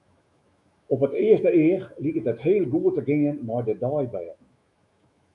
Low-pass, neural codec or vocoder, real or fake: 10.8 kHz; autoencoder, 48 kHz, 128 numbers a frame, DAC-VAE, trained on Japanese speech; fake